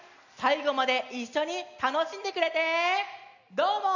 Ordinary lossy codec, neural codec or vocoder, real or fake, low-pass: none; none; real; 7.2 kHz